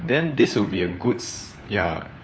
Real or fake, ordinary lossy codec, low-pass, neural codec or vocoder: fake; none; none; codec, 16 kHz, 4 kbps, FunCodec, trained on LibriTTS, 50 frames a second